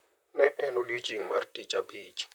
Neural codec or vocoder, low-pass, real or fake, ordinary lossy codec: vocoder, 44.1 kHz, 128 mel bands, Pupu-Vocoder; 19.8 kHz; fake; none